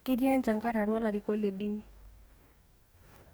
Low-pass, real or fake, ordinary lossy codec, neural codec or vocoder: none; fake; none; codec, 44.1 kHz, 2.6 kbps, DAC